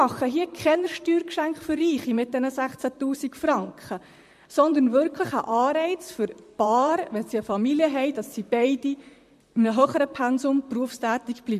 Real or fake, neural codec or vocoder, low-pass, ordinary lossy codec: fake; vocoder, 44.1 kHz, 128 mel bands, Pupu-Vocoder; 14.4 kHz; MP3, 64 kbps